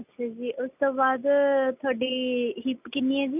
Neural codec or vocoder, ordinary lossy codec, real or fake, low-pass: none; none; real; 3.6 kHz